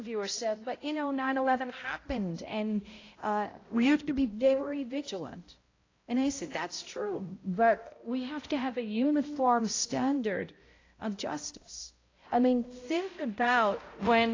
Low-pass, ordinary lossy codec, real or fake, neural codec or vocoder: 7.2 kHz; AAC, 32 kbps; fake; codec, 16 kHz, 0.5 kbps, X-Codec, HuBERT features, trained on balanced general audio